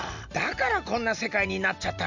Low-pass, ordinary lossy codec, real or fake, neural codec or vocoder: 7.2 kHz; none; real; none